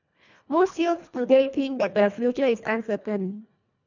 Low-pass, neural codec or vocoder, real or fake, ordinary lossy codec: 7.2 kHz; codec, 24 kHz, 1.5 kbps, HILCodec; fake; none